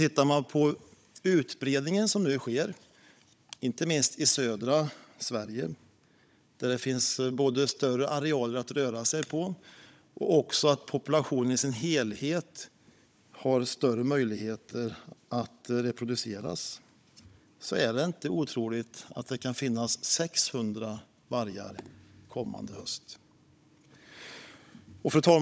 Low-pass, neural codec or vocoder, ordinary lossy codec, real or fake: none; codec, 16 kHz, 16 kbps, FunCodec, trained on Chinese and English, 50 frames a second; none; fake